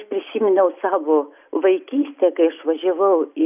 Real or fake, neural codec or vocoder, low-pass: real; none; 3.6 kHz